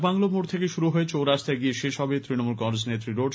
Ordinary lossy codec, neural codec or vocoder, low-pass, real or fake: none; none; none; real